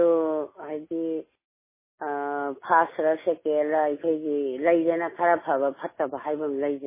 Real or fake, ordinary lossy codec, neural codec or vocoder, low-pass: real; MP3, 16 kbps; none; 3.6 kHz